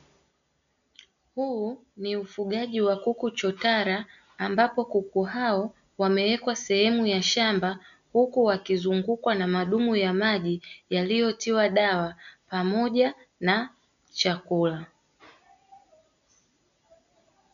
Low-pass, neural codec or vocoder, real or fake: 7.2 kHz; none; real